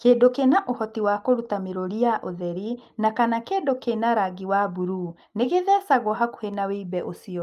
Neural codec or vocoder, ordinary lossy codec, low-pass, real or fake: none; Opus, 32 kbps; 14.4 kHz; real